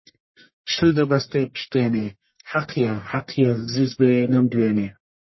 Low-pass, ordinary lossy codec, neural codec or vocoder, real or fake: 7.2 kHz; MP3, 24 kbps; codec, 44.1 kHz, 1.7 kbps, Pupu-Codec; fake